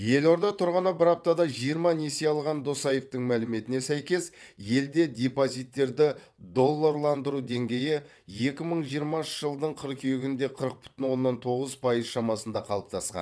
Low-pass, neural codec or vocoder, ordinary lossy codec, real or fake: none; vocoder, 22.05 kHz, 80 mel bands, WaveNeXt; none; fake